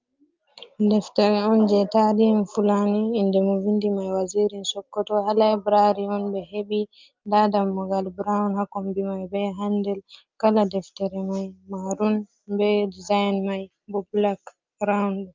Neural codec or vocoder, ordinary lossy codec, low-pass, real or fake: none; Opus, 24 kbps; 7.2 kHz; real